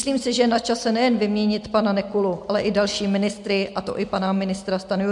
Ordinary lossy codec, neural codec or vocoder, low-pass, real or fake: MP3, 64 kbps; none; 10.8 kHz; real